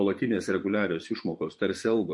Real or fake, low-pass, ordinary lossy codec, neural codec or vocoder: fake; 10.8 kHz; MP3, 48 kbps; vocoder, 24 kHz, 100 mel bands, Vocos